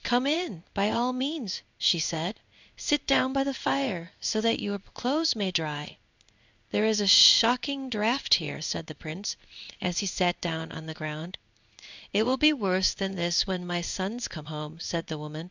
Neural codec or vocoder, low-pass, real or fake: codec, 16 kHz in and 24 kHz out, 1 kbps, XY-Tokenizer; 7.2 kHz; fake